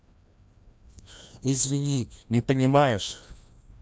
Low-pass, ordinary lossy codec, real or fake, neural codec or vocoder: none; none; fake; codec, 16 kHz, 1 kbps, FreqCodec, larger model